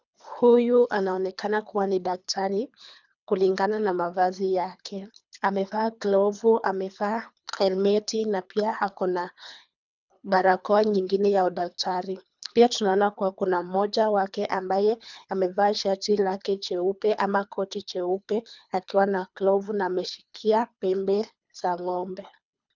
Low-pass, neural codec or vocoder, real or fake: 7.2 kHz; codec, 24 kHz, 3 kbps, HILCodec; fake